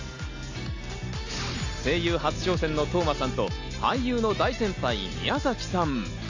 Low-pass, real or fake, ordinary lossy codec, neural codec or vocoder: 7.2 kHz; real; AAC, 48 kbps; none